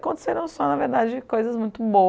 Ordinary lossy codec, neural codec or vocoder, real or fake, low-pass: none; none; real; none